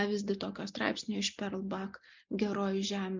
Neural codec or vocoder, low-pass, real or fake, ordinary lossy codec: none; 7.2 kHz; real; MP3, 64 kbps